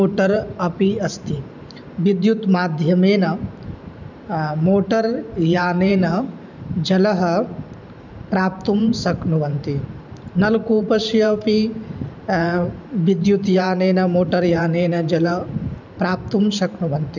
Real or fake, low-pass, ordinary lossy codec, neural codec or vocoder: fake; 7.2 kHz; none; vocoder, 44.1 kHz, 128 mel bands every 512 samples, BigVGAN v2